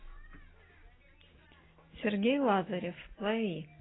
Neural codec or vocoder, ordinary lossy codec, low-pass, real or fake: none; AAC, 16 kbps; 7.2 kHz; real